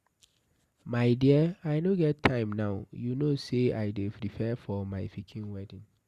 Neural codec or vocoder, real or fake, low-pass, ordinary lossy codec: none; real; 14.4 kHz; Opus, 64 kbps